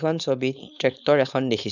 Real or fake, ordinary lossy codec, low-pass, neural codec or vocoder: fake; none; 7.2 kHz; codec, 16 kHz, 4.8 kbps, FACodec